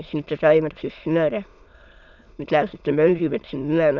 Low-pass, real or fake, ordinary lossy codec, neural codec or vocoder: 7.2 kHz; fake; none; autoencoder, 22.05 kHz, a latent of 192 numbers a frame, VITS, trained on many speakers